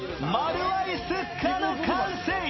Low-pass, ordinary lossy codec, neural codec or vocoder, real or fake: 7.2 kHz; MP3, 24 kbps; none; real